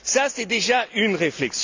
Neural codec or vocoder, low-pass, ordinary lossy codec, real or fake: none; 7.2 kHz; AAC, 48 kbps; real